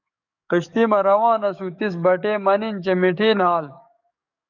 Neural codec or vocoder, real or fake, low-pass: codec, 44.1 kHz, 7.8 kbps, DAC; fake; 7.2 kHz